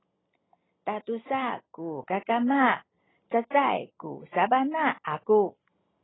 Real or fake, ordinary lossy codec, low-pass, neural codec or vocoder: real; AAC, 16 kbps; 7.2 kHz; none